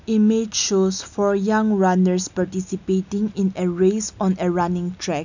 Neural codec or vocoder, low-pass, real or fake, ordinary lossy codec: none; 7.2 kHz; real; none